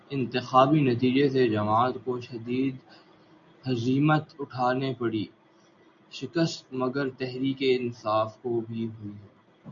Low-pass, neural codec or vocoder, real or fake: 7.2 kHz; none; real